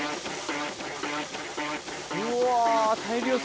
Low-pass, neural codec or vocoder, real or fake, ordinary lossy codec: none; none; real; none